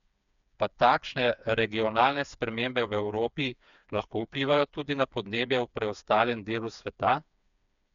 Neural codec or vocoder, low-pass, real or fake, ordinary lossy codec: codec, 16 kHz, 4 kbps, FreqCodec, smaller model; 7.2 kHz; fake; MP3, 96 kbps